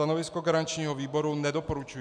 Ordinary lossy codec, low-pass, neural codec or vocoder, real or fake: MP3, 96 kbps; 9.9 kHz; none; real